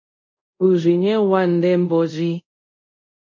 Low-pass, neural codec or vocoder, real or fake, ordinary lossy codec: 7.2 kHz; codec, 24 kHz, 0.5 kbps, DualCodec; fake; MP3, 48 kbps